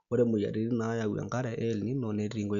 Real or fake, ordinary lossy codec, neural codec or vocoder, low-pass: real; Opus, 64 kbps; none; 7.2 kHz